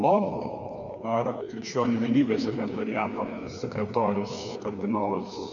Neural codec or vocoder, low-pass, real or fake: codec, 16 kHz, 2 kbps, FreqCodec, larger model; 7.2 kHz; fake